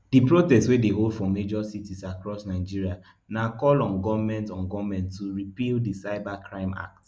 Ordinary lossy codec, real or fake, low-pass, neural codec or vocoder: none; real; none; none